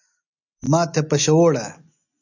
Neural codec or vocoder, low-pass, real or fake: none; 7.2 kHz; real